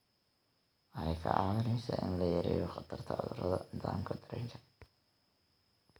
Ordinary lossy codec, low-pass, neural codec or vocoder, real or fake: none; none; none; real